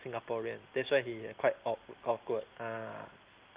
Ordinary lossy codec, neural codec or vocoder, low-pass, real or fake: Opus, 32 kbps; none; 3.6 kHz; real